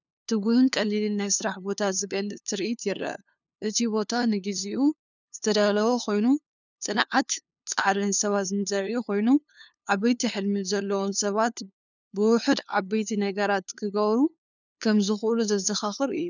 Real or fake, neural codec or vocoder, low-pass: fake; codec, 16 kHz, 2 kbps, FunCodec, trained on LibriTTS, 25 frames a second; 7.2 kHz